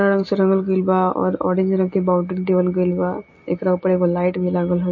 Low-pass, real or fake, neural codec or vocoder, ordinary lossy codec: 7.2 kHz; real; none; MP3, 32 kbps